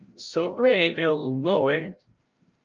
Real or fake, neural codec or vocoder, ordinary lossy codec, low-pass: fake; codec, 16 kHz, 0.5 kbps, FreqCodec, larger model; Opus, 24 kbps; 7.2 kHz